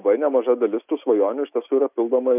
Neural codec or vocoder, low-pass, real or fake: none; 3.6 kHz; real